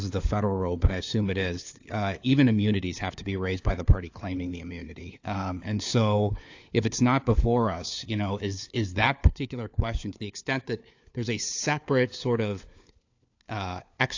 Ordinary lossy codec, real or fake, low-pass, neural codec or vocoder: AAC, 48 kbps; fake; 7.2 kHz; codec, 16 kHz, 4 kbps, FunCodec, trained on LibriTTS, 50 frames a second